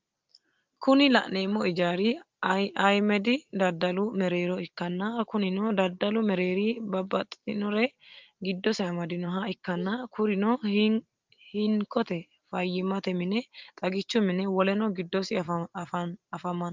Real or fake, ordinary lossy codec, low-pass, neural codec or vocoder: real; Opus, 32 kbps; 7.2 kHz; none